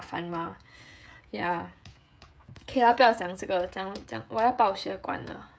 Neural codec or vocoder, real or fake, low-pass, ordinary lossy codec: codec, 16 kHz, 16 kbps, FreqCodec, smaller model; fake; none; none